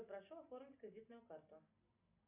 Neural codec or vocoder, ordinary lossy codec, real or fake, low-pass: none; MP3, 32 kbps; real; 3.6 kHz